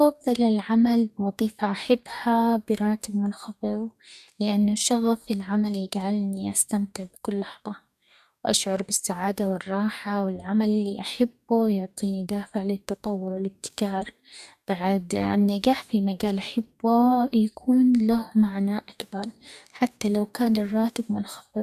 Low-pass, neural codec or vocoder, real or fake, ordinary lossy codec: 14.4 kHz; codec, 44.1 kHz, 2.6 kbps, DAC; fake; none